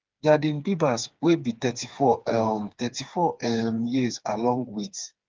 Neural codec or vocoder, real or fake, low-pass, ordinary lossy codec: codec, 16 kHz, 4 kbps, FreqCodec, smaller model; fake; 7.2 kHz; Opus, 32 kbps